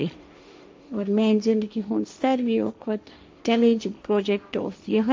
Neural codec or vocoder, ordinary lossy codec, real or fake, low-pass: codec, 16 kHz, 1.1 kbps, Voila-Tokenizer; none; fake; none